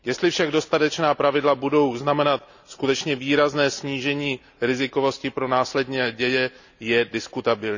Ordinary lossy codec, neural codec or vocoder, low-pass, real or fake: none; none; 7.2 kHz; real